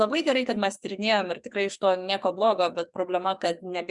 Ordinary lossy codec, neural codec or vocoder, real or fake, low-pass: Opus, 64 kbps; codec, 44.1 kHz, 2.6 kbps, SNAC; fake; 10.8 kHz